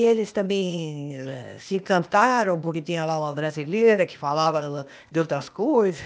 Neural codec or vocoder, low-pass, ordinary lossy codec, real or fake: codec, 16 kHz, 0.8 kbps, ZipCodec; none; none; fake